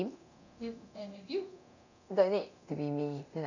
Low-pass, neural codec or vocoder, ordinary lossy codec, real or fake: 7.2 kHz; codec, 24 kHz, 0.9 kbps, DualCodec; none; fake